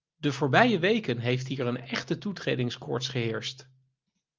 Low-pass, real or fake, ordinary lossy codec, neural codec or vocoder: 7.2 kHz; real; Opus, 24 kbps; none